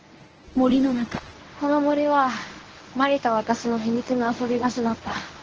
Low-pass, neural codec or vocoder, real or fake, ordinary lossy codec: 7.2 kHz; codec, 24 kHz, 0.9 kbps, WavTokenizer, medium speech release version 1; fake; Opus, 16 kbps